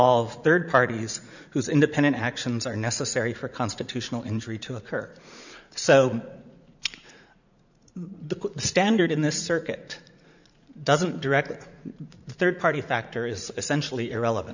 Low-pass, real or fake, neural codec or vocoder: 7.2 kHz; fake; vocoder, 44.1 kHz, 80 mel bands, Vocos